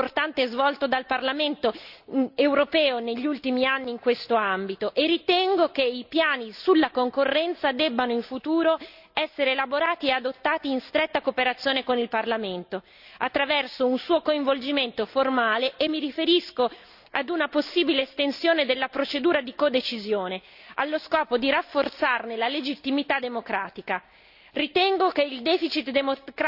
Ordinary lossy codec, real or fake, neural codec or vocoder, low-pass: Opus, 64 kbps; real; none; 5.4 kHz